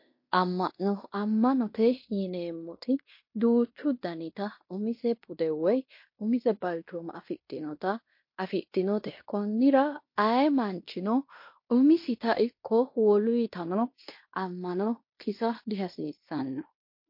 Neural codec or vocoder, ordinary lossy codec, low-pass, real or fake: codec, 16 kHz in and 24 kHz out, 0.9 kbps, LongCat-Audio-Codec, fine tuned four codebook decoder; MP3, 32 kbps; 5.4 kHz; fake